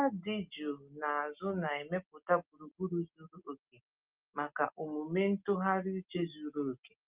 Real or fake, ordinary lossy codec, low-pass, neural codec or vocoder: real; Opus, 24 kbps; 3.6 kHz; none